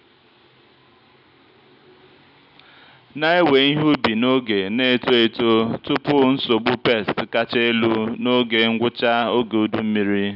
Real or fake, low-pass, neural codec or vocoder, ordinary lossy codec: real; 5.4 kHz; none; none